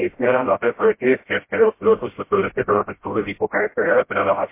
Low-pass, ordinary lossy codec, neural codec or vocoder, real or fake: 3.6 kHz; MP3, 24 kbps; codec, 16 kHz, 0.5 kbps, FreqCodec, smaller model; fake